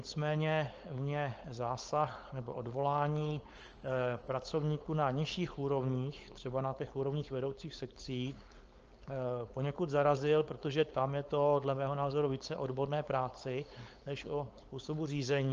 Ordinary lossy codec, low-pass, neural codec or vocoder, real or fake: Opus, 24 kbps; 7.2 kHz; codec, 16 kHz, 4.8 kbps, FACodec; fake